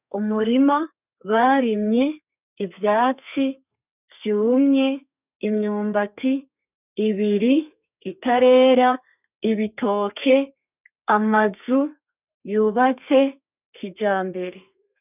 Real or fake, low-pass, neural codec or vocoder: fake; 3.6 kHz; codec, 32 kHz, 1.9 kbps, SNAC